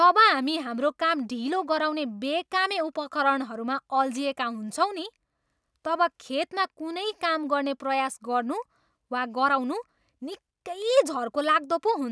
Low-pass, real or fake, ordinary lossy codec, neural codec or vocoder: none; real; none; none